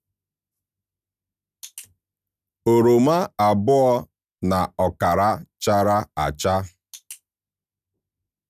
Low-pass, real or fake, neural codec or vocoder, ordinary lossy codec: 14.4 kHz; real; none; none